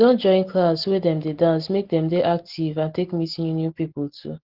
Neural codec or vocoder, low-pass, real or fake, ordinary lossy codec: none; 5.4 kHz; real; Opus, 16 kbps